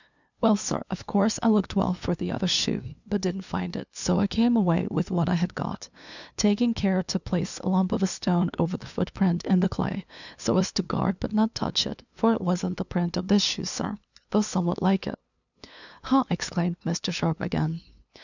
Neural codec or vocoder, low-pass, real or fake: codec, 16 kHz, 2 kbps, FunCodec, trained on LibriTTS, 25 frames a second; 7.2 kHz; fake